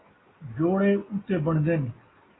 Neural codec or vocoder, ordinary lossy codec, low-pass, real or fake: none; AAC, 16 kbps; 7.2 kHz; real